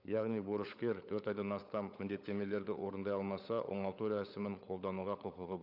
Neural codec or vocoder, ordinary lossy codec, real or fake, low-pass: codec, 16 kHz, 16 kbps, FunCodec, trained on LibriTTS, 50 frames a second; none; fake; 5.4 kHz